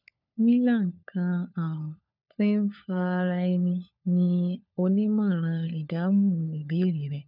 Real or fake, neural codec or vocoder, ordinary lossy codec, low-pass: fake; codec, 16 kHz, 4 kbps, FunCodec, trained on LibriTTS, 50 frames a second; none; 5.4 kHz